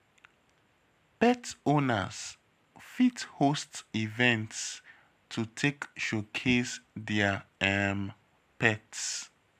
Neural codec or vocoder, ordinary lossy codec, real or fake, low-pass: none; AAC, 96 kbps; real; 14.4 kHz